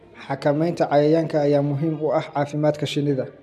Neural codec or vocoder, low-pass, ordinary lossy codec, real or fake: none; 14.4 kHz; MP3, 96 kbps; real